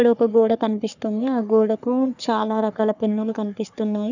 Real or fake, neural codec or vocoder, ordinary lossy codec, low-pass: fake; codec, 44.1 kHz, 3.4 kbps, Pupu-Codec; none; 7.2 kHz